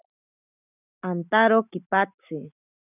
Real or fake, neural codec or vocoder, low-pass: real; none; 3.6 kHz